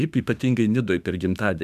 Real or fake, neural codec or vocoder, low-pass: fake; autoencoder, 48 kHz, 32 numbers a frame, DAC-VAE, trained on Japanese speech; 14.4 kHz